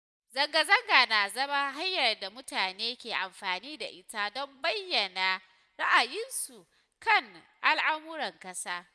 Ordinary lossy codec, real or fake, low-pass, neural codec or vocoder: none; real; none; none